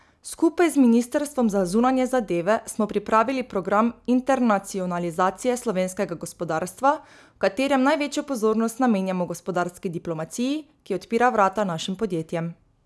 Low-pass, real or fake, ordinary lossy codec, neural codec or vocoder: none; real; none; none